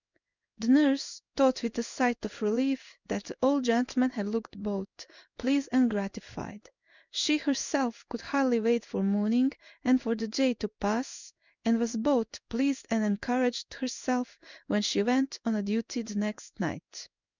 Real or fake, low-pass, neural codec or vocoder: fake; 7.2 kHz; codec, 16 kHz in and 24 kHz out, 1 kbps, XY-Tokenizer